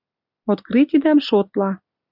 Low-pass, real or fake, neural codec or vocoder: 5.4 kHz; real; none